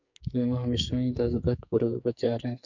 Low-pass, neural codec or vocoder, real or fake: 7.2 kHz; codec, 44.1 kHz, 2.6 kbps, SNAC; fake